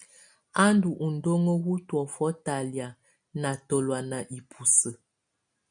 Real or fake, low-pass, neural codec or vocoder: real; 9.9 kHz; none